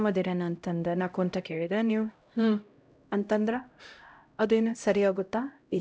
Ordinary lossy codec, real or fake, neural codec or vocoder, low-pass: none; fake; codec, 16 kHz, 0.5 kbps, X-Codec, HuBERT features, trained on LibriSpeech; none